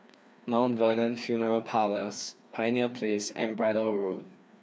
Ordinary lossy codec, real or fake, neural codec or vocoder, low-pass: none; fake; codec, 16 kHz, 2 kbps, FreqCodec, larger model; none